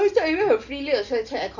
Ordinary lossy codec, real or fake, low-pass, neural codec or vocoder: none; real; 7.2 kHz; none